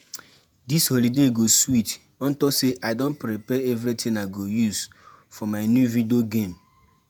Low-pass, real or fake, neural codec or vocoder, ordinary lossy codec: none; fake; vocoder, 48 kHz, 128 mel bands, Vocos; none